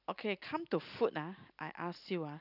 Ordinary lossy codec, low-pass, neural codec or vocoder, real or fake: none; 5.4 kHz; none; real